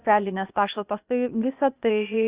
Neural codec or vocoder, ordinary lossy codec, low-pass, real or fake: codec, 16 kHz, 0.8 kbps, ZipCodec; Opus, 64 kbps; 3.6 kHz; fake